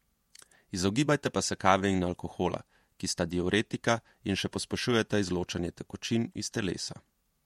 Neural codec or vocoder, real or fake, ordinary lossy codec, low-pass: vocoder, 48 kHz, 128 mel bands, Vocos; fake; MP3, 64 kbps; 19.8 kHz